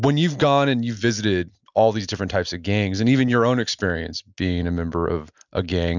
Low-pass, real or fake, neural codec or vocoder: 7.2 kHz; real; none